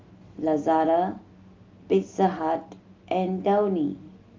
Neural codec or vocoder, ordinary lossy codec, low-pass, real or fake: none; Opus, 32 kbps; 7.2 kHz; real